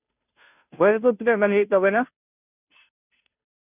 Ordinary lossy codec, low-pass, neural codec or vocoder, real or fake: none; 3.6 kHz; codec, 16 kHz, 0.5 kbps, FunCodec, trained on Chinese and English, 25 frames a second; fake